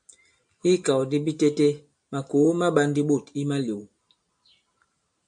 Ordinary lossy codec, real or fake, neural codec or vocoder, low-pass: MP3, 96 kbps; real; none; 9.9 kHz